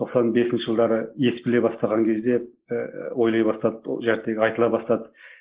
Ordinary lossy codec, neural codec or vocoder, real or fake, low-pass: Opus, 16 kbps; none; real; 3.6 kHz